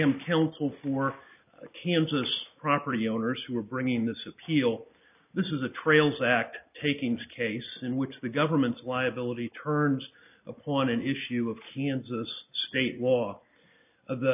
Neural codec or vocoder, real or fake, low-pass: none; real; 3.6 kHz